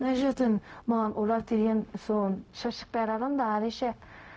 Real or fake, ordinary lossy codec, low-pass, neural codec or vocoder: fake; none; none; codec, 16 kHz, 0.4 kbps, LongCat-Audio-Codec